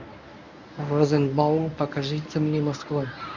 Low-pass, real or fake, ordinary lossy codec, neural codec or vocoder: 7.2 kHz; fake; none; codec, 24 kHz, 0.9 kbps, WavTokenizer, medium speech release version 1